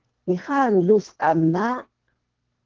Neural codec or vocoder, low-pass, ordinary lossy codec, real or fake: codec, 24 kHz, 1.5 kbps, HILCodec; 7.2 kHz; Opus, 16 kbps; fake